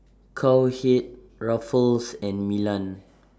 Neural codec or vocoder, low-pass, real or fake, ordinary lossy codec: none; none; real; none